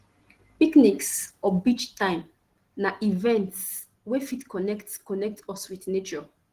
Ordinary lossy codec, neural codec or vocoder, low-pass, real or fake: Opus, 16 kbps; none; 14.4 kHz; real